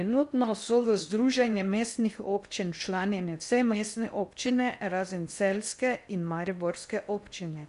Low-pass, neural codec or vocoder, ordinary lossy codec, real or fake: 10.8 kHz; codec, 16 kHz in and 24 kHz out, 0.6 kbps, FocalCodec, streaming, 4096 codes; none; fake